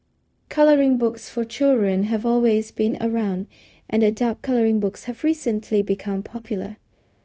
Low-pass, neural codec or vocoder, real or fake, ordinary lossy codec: none; codec, 16 kHz, 0.4 kbps, LongCat-Audio-Codec; fake; none